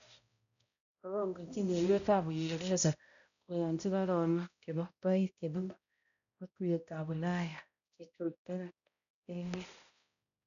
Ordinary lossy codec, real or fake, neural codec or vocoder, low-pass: none; fake; codec, 16 kHz, 0.5 kbps, X-Codec, HuBERT features, trained on balanced general audio; 7.2 kHz